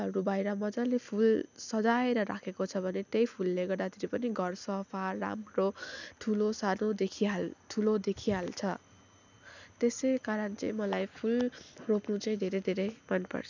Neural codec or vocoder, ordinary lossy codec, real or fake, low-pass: none; none; real; 7.2 kHz